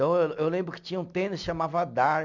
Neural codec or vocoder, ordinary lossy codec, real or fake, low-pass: none; none; real; 7.2 kHz